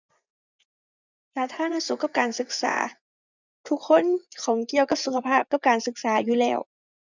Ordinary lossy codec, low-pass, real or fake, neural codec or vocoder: none; 7.2 kHz; fake; vocoder, 24 kHz, 100 mel bands, Vocos